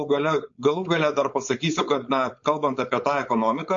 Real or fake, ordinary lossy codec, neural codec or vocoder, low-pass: fake; MP3, 48 kbps; codec, 16 kHz, 4.8 kbps, FACodec; 7.2 kHz